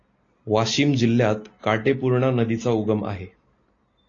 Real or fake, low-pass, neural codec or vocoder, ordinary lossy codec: real; 7.2 kHz; none; AAC, 32 kbps